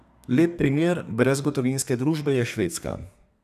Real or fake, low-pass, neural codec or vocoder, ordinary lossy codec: fake; 14.4 kHz; codec, 32 kHz, 1.9 kbps, SNAC; none